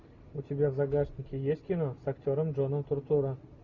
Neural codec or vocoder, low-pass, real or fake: none; 7.2 kHz; real